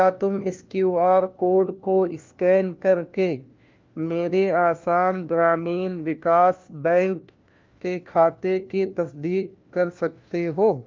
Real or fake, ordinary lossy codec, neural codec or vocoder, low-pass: fake; Opus, 24 kbps; codec, 16 kHz, 1 kbps, FunCodec, trained on LibriTTS, 50 frames a second; 7.2 kHz